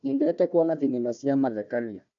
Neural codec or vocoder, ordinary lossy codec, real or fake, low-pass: codec, 16 kHz, 1 kbps, FunCodec, trained on Chinese and English, 50 frames a second; AAC, 64 kbps; fake; 7.2 kHz